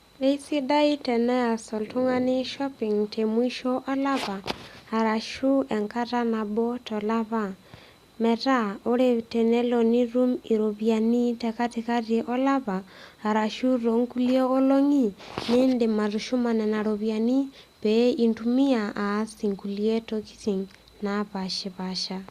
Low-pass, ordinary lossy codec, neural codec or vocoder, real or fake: 14.4 kHz; Opus, 64 kbps; none; real